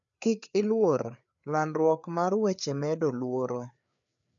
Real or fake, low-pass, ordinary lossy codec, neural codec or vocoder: fake; 7.2 kHz; none; codec, 16 kHz, 4 kbps, FreqCodec, larger model